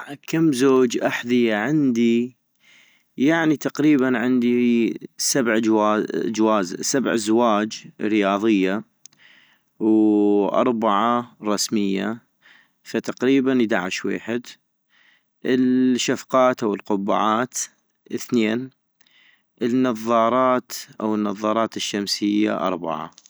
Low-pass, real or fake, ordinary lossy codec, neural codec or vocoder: none; real; none; none